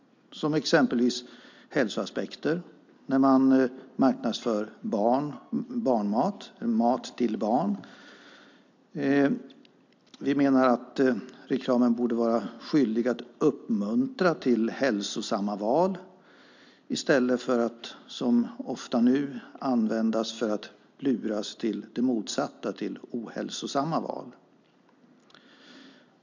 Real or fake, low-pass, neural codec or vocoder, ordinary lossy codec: real; 7.2 kHz; none; MP3, 64 kbps